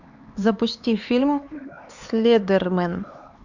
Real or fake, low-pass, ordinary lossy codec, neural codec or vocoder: fake; 7.2 kHz; Opus, 64 kbps; codec, 16 kHz, 4 kbps, X-Codec, HuBERT features, trained on LibriSpeech